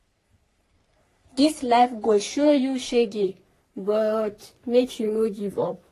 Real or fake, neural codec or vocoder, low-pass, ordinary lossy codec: fake; codec, 44.1 kHz, 3.4 kbps, Pupu-Codec; 14.4 kHz; AAC, 48 kbps